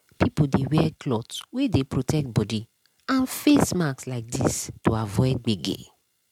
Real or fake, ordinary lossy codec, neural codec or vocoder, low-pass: real; MP3, 96 kbps; none; 19.8 kHz